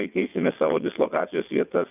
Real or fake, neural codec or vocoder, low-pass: fake; vocoder, 22.05 kHz, 80 mel bands, WaveNeXt; 3.6 kHz